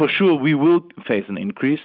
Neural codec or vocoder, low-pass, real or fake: none; 5.4 kHz; real